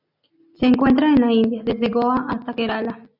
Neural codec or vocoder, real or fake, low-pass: none; real; 5.4 kHz